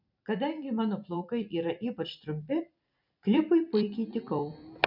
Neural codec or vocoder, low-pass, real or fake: vocoder, 44.1 kHz, 128 mel bands every 256 samples, BigVGAN v2; 5.4 kHz; fake